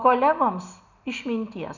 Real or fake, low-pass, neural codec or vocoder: real; 7.2 kHz; none